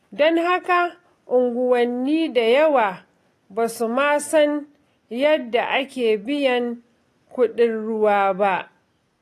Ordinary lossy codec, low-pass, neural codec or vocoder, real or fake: AAC, 48 kbps; 14.4 kHz; none; real